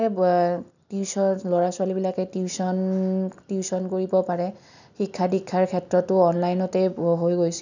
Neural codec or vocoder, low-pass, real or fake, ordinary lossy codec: none; 7.2 kHz; real; none